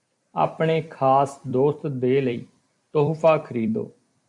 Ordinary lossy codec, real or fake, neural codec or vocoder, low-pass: AAC, 64 kbps; fake; vocoder, 44.1 kHz, 128 mel bands every 256 samples, BigVGAN v2; 10.8 kHz